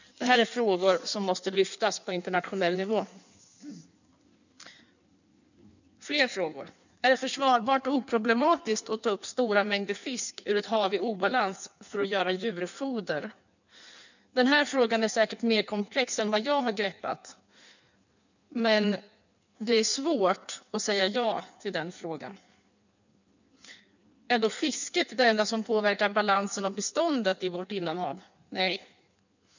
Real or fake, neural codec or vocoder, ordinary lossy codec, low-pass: fake; codec, 16 kHz in and 24 kHz out, 1.1 kbps, FireRedTTS-2 codec; none; 7.2 kHz